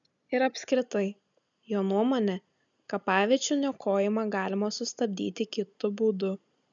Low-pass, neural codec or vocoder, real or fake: 7.2 kHz; none; real